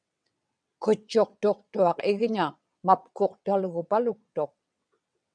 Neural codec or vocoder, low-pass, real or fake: vocoder, 22.05 kHz, 80 mel bands, WaveNeXt; 9.9 kHz; fake